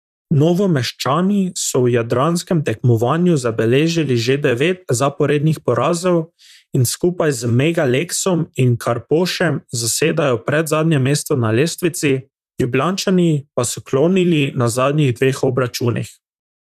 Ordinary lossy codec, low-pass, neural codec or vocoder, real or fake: none; 14.4 kHz; vocoder, 44.1 kHz, 128 mel bands, Pupu-Vocoder; fake